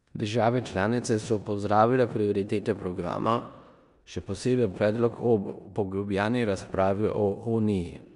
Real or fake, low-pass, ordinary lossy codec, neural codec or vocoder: fake; 10.8 kHz; none; codec, 16 kHz in and 24 kHz out, 0.9 kbps, LongCat-Audio-Codec, four codebook decoder